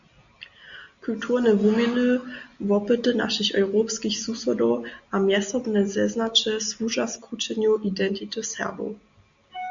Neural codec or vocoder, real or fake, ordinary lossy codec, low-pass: none; real; Opus, 64 kbps; 7.2 kHz